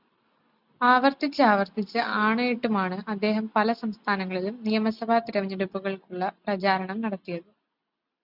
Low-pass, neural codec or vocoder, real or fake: 5.4 kHz; none; real